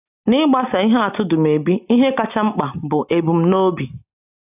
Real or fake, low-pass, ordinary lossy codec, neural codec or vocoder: real; 3.6 kHz; AAC, 32 kbps; none